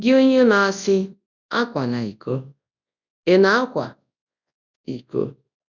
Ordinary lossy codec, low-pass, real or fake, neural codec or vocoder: none; 7.2 kHz; fake; codec, 24 kHz, 0.9 kbps, WavTokenizer, large speech release